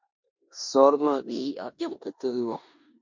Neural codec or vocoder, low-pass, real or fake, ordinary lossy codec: codec, 16 kHz in and 24 kHz out, 0.9 kbps, LongCat-Audio-Codec, four codebook decoder; 7.2 kHz; fake; MP3, 48 kbps